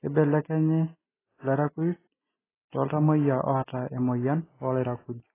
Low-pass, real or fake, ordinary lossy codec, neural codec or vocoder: 3.6 kHz; real; AAC, 16 kbps; none